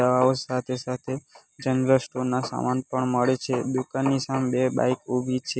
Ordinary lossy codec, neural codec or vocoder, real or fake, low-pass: none; none; real; none